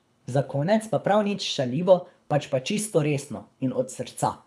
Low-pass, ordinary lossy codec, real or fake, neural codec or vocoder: none; none; fake; codec, 24 kHz, 6 kbps, HILCodec